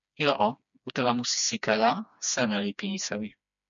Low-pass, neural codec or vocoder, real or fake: 7.2 kHz; codec, 16 kHz, 2 kbps, FreqCodec, smaller model; fake